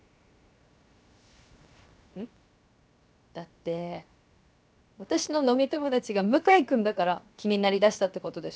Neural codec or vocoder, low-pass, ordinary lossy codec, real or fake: codec, 16 kHz, 0.7 kbps, FocalCodec; none; none; fake